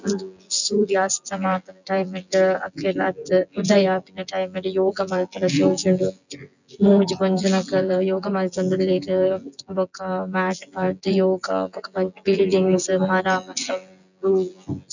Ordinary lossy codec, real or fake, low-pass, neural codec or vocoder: none; fake; 7.2 kHz; vocoder, 24 kHz, 100 mel bands, Vocos